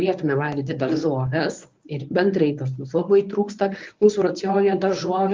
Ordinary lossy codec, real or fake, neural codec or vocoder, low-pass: Opus, 24 kbps; fake; codec, 24 kHz, 0.9 kbps, WavTokenizer, medium speech release version 1; 7.2 kHz